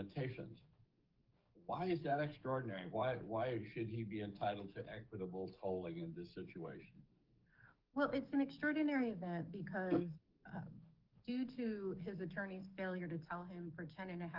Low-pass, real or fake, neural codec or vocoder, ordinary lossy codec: 5.4 kHz; fake; codec, 44.1 kHz, 7.8 kbps, DAC; Opus, 24 kbps